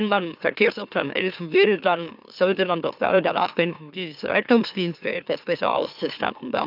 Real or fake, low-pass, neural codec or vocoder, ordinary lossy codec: fake; 5.4 kHz; autoencoder, 44.1 kHz, a latent of 192 numbers a frame, MeloTTS; none